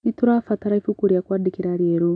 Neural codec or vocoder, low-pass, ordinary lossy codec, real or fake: none; 9.9 kHz; none; real